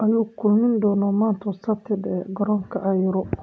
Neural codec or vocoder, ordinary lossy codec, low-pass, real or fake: none; none; none; real